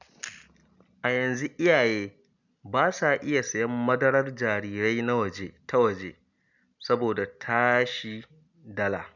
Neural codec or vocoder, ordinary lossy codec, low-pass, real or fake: none; none; 7.2 kHz; real